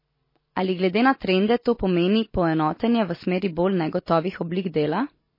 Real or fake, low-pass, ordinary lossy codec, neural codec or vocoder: real; 5.4 kHz; MP3, 24 kbps; none